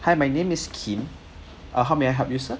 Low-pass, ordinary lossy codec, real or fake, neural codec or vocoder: none; none; real; none